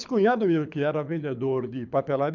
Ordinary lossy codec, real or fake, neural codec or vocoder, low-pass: none; fake; codec, 24 kHz, 6 kbps, HILCodec; 7.2 kHz